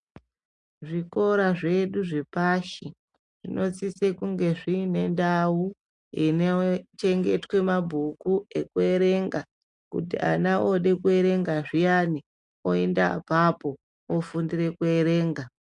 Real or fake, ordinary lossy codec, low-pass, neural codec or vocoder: real; MP3, 64 kbps; 10.8 kHz; none